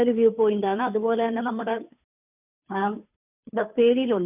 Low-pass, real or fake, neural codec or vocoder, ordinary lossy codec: 3.6 kHz; fake; codec, 16 kHz, 2 kbps, FunCodec, trained on Chinese and English, 25 frames a second; none